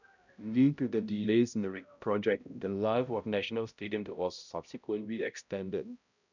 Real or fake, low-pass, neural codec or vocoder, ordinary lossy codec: fake; 7.2 kHz; codec, 16 kHz, 0.5 kbps, X-Codec, HuBERT features, trained on balanced general audio; none